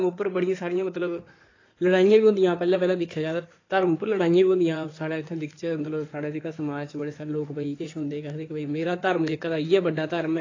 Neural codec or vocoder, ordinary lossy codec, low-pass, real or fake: codec, 16 kHz in and 24 kHz out, 2.2 kbps, FireRedTTS-2 codec; AAC, 32 kbps; 7.2 kHz; fake